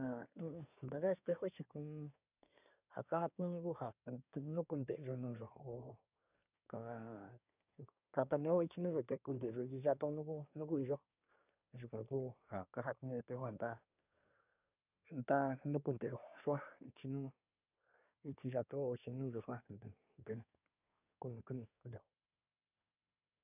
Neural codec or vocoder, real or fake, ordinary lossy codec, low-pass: codec, 24 kHz, 1 kbps, SNAC; fake; none; 3.6 kHz